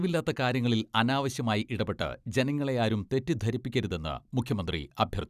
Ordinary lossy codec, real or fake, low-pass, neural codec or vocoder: none; fake; 14.4 kHz; vocoder, 44.1 kHz, 128 mel bands every 256 samples, BigVGAN v2